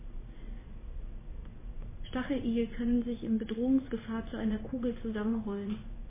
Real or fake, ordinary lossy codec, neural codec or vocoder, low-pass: fake; MP3, 16 kbps; codec, 16 kHz in and 24 kHz out, 1 kbps, XY-Tokenizer; 3.6 kHz